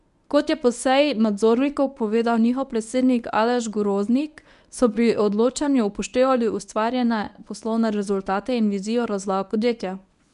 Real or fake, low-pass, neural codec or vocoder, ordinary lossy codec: fake; 10.8 kHz; codec, 24 kHz, 0.9 kbps, WavTokenizer, medium speech release version 1; none